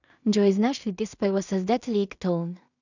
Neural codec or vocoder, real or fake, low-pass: codec, 16 kHz in and 24 kHz out, 0.4 kbps, LongCat-Audio-Codec, two codebook decoder; fake; 7.2 kHz